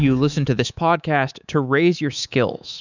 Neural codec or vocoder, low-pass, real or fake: codec, 24 kHz, 3.1 kbps, DualCodec; 7.2 kHz; fake